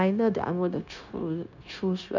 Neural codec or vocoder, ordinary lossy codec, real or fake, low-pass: codec, 16 kHz, 0.9 kbps, LongCat-Audio-Codec; none; fake; 7.2 kHz